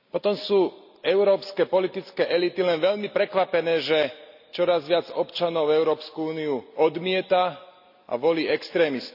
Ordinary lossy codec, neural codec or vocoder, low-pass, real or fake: none; none; 5.4 kHz; real